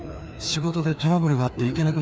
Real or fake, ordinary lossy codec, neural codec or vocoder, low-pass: fake; none; codec, 16 kHz, 2 kbps, FreqCodec, larger model; none